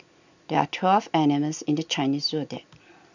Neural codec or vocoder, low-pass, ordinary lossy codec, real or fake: none; 7.2 kHz; none; real